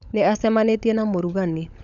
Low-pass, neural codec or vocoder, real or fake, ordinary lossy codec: 7.2 kHz; codec, 16 kHz, 16 kbps, FunCodec, trained on LibriTTS, 50 frames a second; fake; none